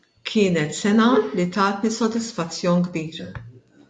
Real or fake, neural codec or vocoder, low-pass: real; none; 9.9 kHz